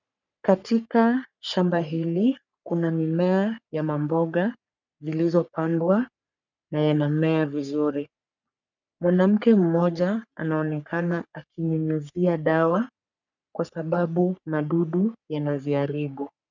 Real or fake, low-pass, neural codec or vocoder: fake; 7.2 kHz; codec, 44.1 kHz, 3.4 kbps, Pupu-Codec